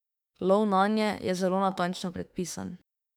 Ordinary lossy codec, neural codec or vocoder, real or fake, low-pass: none; autoencoder, 48 kHz, 32 numbers a frame, DAC-VAE, trained on Japanese speech; fake; 19.8 kHz